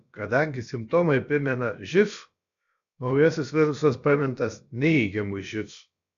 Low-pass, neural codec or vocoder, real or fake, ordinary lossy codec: 7.2 kHz; codec, 16 kHz, about 1 kbps, DyCAST, with the encoder's durations; fake; AAC, 96 kbps